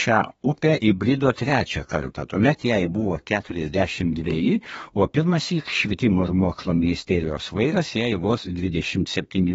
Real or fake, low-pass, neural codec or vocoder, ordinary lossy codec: fake; 14.4 kHz; codec, 32 kHz, 1.9 kbps, SNAC; AAC, 24 kbps